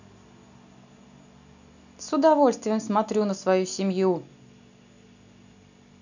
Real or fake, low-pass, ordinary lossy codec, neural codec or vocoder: real; 7.2 kHz; none; none